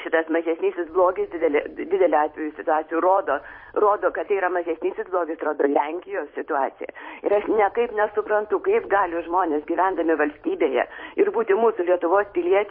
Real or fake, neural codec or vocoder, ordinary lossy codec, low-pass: fake; vocoder, 44.1 kHz, 128 mel bands every 256 samples, BigVGAN v2; MP3, 24 kbps; 5.4 kHz